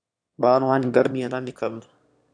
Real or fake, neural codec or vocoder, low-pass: fake; autoencoder, 22.05 kHz, a latent of 192 numbers a frame, VITS, trained on one speaker; 9.9 kHz